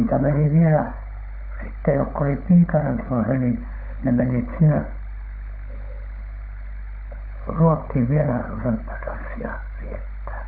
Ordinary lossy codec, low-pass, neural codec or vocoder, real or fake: none; 5.4 kHz; codec, 16 kHz, 16 kbps, FunCodec, trained on LibriTTS, 50 frames a second; fake